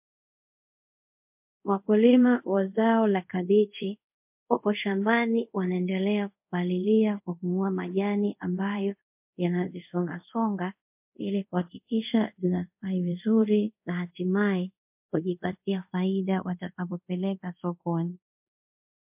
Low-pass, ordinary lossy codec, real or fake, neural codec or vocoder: 3.6 kHz; MP3, 32 kbps; fake; codec, 24 kHz, 0.5 kbps, DualCodec